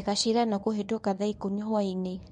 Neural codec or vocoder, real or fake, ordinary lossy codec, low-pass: codec, 24 kHz, 0.9 kbps, WavTokenizer, medium speech release version 1; fake; none; 10.8 kHz